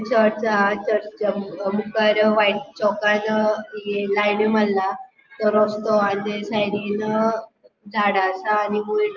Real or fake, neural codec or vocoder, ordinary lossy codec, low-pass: real; none; Opus, 24 kbps; 7.2 kHz